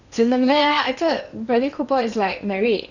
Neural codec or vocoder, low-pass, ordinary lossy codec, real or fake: codec, 16 kHz in and 24 kHz out, 0.8 kbps, FocalCodec, streaming, 65536 codes; 7.2 kHz; none; fake